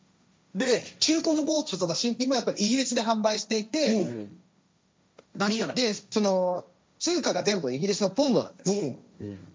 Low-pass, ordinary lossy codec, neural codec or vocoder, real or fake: none; none; codec, 16 kHz, 1.1 kbps, Voila-Tokenizer; fake